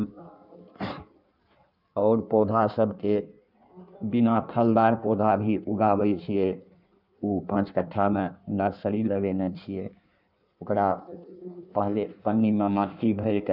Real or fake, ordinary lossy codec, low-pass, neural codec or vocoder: fake; none; 5.4 kHz; codec, 16 kHz in and 24 kHz out, 1.1 kbps, FireRedTTS-2 codec